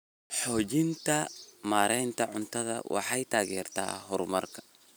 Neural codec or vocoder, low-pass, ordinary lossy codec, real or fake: none; none; none; real